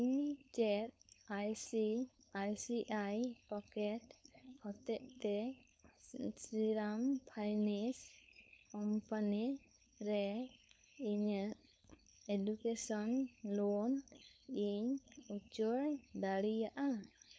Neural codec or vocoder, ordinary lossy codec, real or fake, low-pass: codec, 16 kHz, 4.8 kbps, FACodec; none; fake; none